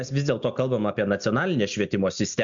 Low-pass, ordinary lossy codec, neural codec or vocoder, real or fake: 7.2 kHz; MP3, 64 kbps; none; real